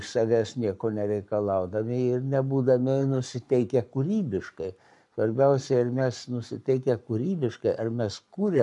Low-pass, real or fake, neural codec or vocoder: 10.8 kHz; fake; codec, 44.1 kHz, 7.8 kbps, Pupu-Codec